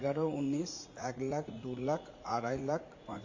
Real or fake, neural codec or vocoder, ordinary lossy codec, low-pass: fake; vocoder, 44.1 kHz, 80 mel bands, Vocos; MP3, 32 kbps; 7.2 kHz